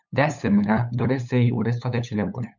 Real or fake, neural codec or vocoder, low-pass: fake; codec, 16 kHz, 8 kbps, FunCodec, trained on LibriTTS, 25 frames a second; 7.2 kHz